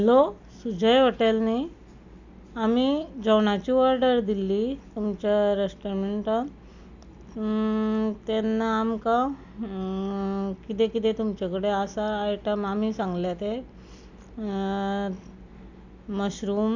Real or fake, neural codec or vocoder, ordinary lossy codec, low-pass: real; none; none; 7.2 kHz